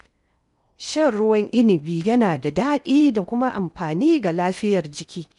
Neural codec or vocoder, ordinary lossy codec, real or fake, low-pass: codec, 16 kHz in and 24 kHz out, 0.6 kbps, FocalCodec, streaming, 2048 codes; none; fake; 10.8 kHz